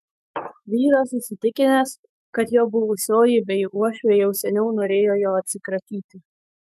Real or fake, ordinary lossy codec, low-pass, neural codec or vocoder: fake; MP3, 96 kbps; 14.4 kHz; autoencoder, 48 kHz, 128 numbers a frame, DAC-VAE, trained on Japanese speech